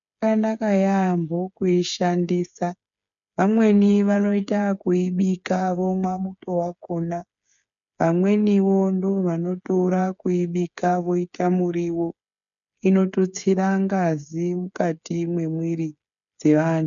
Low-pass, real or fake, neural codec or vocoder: 7.2 kHz; fake; codec, 16 kHz, 8 kbps, FreqCodec, smaller model